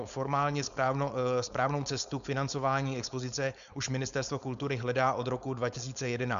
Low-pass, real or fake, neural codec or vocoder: 7.2 kHz; fake; codec, 16 kHz, 4.8 kbps, FACodec